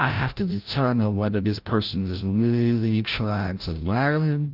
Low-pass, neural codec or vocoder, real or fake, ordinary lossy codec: 5.4 kHz; codec, 16 kHz, 0.5 kbps, FreqCodec, larger model; fake; Opus, 24 kbps